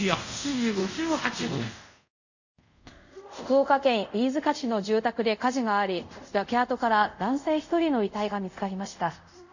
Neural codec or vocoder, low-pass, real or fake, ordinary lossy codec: codec, 24 kHz, 0.5 kbps, DualCodec; 7.2 kHz; fake; none